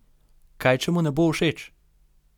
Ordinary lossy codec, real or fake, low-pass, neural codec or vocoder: none; real; 19.8 kHz; none